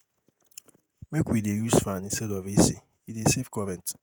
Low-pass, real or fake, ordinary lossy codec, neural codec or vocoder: none; fake; none; vocoder, 48 kHz, 128 mel bands, Vocos